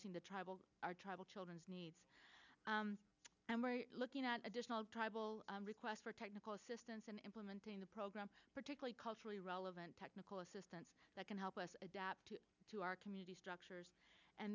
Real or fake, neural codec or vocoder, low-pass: real; none; 7.2 kHz